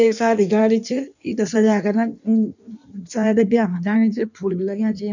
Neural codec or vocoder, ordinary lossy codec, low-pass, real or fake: codec, 16 kHz in and 24 kHz out, 1.1 kbps, FireRedTTS-2 codec; none; 7.2 kHz; fake